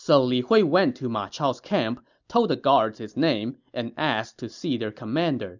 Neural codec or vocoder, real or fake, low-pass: none; real; 7.2 kHz